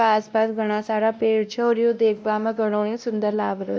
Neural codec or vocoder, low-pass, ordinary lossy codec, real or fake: codec, 16 kHz, 1 kbps, X-Codec, WavLM features, trained on Multilingual LibriSpeech; none; none; fake